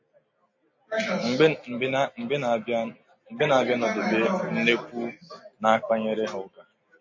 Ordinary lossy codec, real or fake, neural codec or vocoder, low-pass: MP3, 32 kbps; real; none; 7.2 kHz